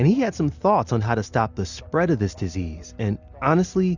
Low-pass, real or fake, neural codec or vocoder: 7.2 kHz; real; none